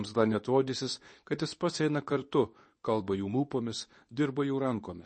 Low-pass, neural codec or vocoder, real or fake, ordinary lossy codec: 10.8 kHz; codec, 24 kHz, 0.9 kbps, WavTokenizer, medium speech release version 2; fake; MP3, 32 kbps